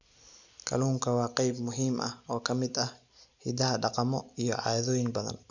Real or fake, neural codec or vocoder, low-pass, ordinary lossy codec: real; none; 7.2 kHz; none